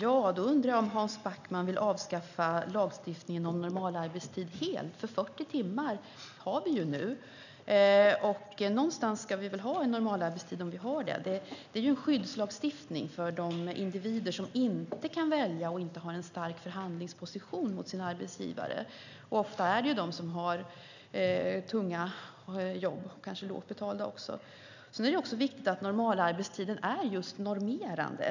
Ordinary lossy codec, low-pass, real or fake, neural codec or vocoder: none; 7.2 kHz; real; none